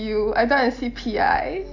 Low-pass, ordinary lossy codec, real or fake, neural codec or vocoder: 7.2 kHz; AAC, 48 kbps; real; none